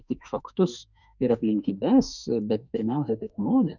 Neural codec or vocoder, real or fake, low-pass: autoencoder, 48 kHz, 32 numbers a frame, DAC-VAE, trained on Japanese speech; fake; 7.2 kHz